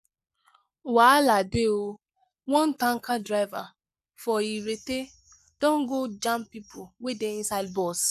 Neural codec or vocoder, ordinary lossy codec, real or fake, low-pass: codec, 44.1 kHz, 7.8 kbps, Pupu-Codec; none; fake; 14.4 kHz